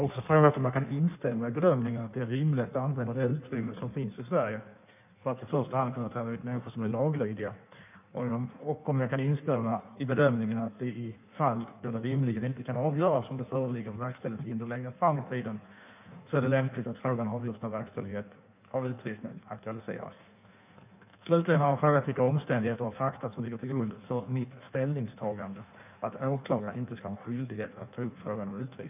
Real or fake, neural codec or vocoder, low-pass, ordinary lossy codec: fake; codec, 16 kHz in and 24 kHz out, 1.1 kbps, FireRedTTS-2 codec; 3.6 kHz; none